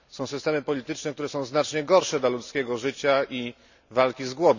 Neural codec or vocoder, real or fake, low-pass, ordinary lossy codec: none; real; 7.2 kHz; none